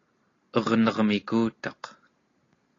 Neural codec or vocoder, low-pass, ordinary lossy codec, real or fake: none; 7.2 kHz; AAC, 32 kbps; real